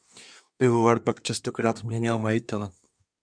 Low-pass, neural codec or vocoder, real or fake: 9.9 kHz; codec, 24 kHz, 1 kbps, SNAC; fake